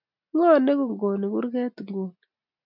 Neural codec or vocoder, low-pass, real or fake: none; 5.4 kHz; real